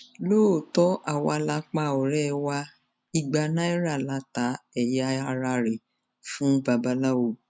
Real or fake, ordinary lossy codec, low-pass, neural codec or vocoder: real; none; none; none